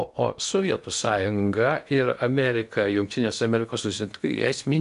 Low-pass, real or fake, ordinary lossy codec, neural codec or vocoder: 10.8 kHz; fake; AAC, 96 kbps; codec, 16 kHz in and 24 kHz out, 0.8 kbps, FocalCodec, streaming, 65536 codes